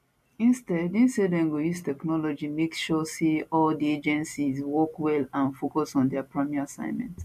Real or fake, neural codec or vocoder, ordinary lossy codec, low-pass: real; none; MP3, 64 kbps; 14.4 kHz